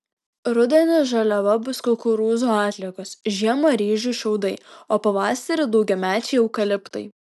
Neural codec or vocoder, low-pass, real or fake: none; 14.4 kHz; real